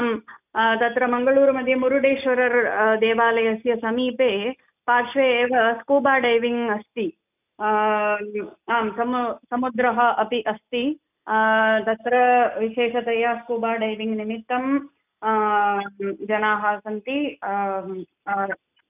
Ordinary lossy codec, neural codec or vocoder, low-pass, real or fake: none; none; 3.6 kHz; real